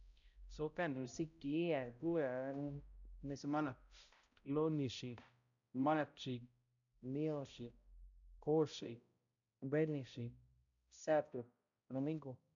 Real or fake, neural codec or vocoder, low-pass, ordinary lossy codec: fake; codec, 16 kHz, 0.5 kbps, X-Codec, HuBERT features, trained on balanced general audio; 7.2 kHz; none